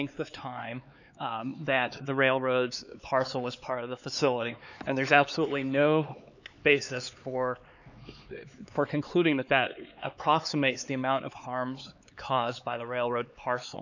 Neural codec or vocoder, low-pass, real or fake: codec, 16 kHz, 4 kbps, X-Codec, HuBERT features, trained on LibriSpeech; 7.2 kHz; fake